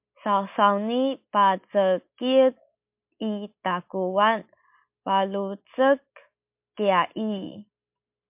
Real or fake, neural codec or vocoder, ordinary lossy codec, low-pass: real; none; MP3, 32 kbps; 3.6 kHz